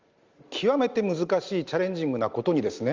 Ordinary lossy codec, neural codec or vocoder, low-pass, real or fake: Opus, 32 kbps; none; 7.2 kHz; real